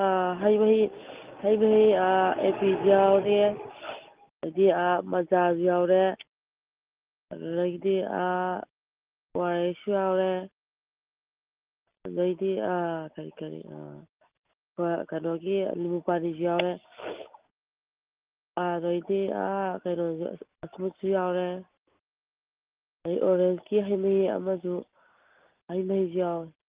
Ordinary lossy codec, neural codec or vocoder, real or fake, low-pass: Opus, 32 kbps; none; real; 3.6 kHz